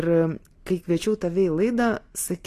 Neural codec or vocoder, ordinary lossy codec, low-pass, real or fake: none; AAC, 48 kbps; 14.4 kHz; real